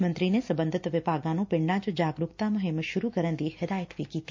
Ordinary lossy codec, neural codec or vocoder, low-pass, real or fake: MP3, 48 kbps; none; 7.2 kHz; real